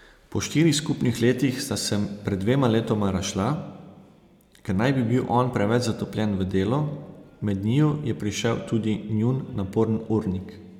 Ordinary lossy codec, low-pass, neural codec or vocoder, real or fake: none; 19.8 kHz; none; real